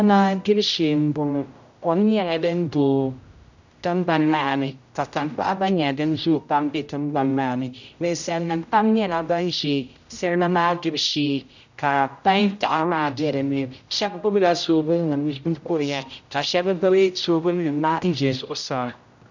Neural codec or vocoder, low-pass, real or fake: codec, 16 kHz, 0.5 kbps, X-Codec, HuBERT features, trained on general audio; 7.2 kHz; fake